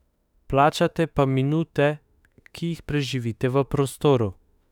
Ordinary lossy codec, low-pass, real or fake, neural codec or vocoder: none; 19.8 kHz; fake; autoencoder, 48 kHz, 32 numbers a frame, DAC-VAE, trained on Japanese speech